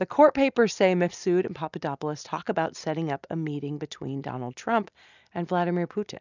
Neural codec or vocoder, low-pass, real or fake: none; 7.2 kHz; real